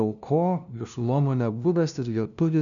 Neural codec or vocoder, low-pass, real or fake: codec, 16 kHz, 0.5 kbps, FunCodec, trained on LibriTTS, 25 frames a second; 7.2 kHz; fake